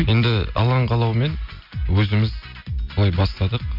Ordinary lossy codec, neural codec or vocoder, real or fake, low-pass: MP3, 48 kbps; none; real; 5.4 kHz